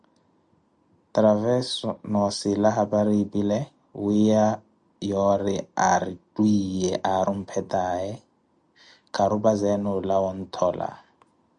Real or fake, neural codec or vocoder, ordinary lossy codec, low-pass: real; none; Opus, 64 kbps; 9.9 kHz